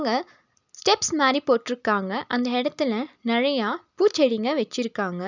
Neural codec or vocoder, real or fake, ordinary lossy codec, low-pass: none; real; none; 7.2 kHz